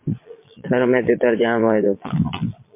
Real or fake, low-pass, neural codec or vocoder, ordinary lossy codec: fake; 3.6 kHz; vocoder, 22.05 kHz, 80 mel bands, Vocos; MP3, 24 kbps